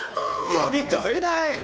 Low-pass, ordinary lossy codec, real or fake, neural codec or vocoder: none; none; fake; codec, 16 kHz, 2 kbps, X-Codec, WavLM features, trained on Multilingual LibriSpeech